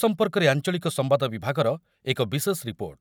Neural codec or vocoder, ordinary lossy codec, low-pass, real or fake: none; none; none; real